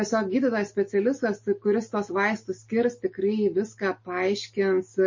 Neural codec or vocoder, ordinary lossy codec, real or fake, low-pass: none; MP3, 32 kbps; real; 7.2 kHz